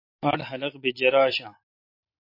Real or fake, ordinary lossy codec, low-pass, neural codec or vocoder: real; MP3, 32 kbps; 5.4 kHz; none